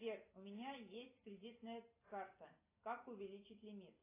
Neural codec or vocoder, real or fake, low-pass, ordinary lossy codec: none; real; 3.6 kHz; AAC, 24 kbps